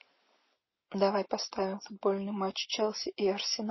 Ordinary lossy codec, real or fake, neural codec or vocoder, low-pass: MP3, 24 kbps; real; none; 7.2 kHz